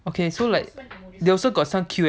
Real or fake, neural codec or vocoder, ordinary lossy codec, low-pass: real; none; none; none